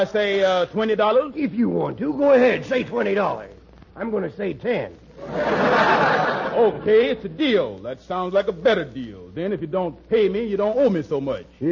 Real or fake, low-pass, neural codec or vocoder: real; 7.2 kHz; none